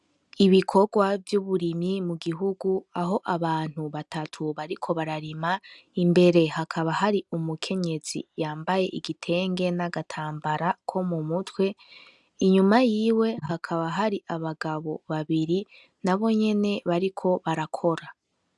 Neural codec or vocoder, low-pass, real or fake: none; 10.8 kHz; real